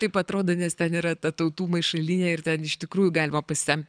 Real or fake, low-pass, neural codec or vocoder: fake; 9.9 kHz; codec, 24 kHz, 6 kbps, HILCodec